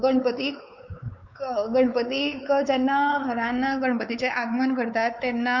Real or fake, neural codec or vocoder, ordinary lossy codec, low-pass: fake; codec, 16 kHz, 4 kbps, X-Codec, WavLM features, trained on Multilingual LibriSpeech; Opus, 64 kbps; 7.2 kHz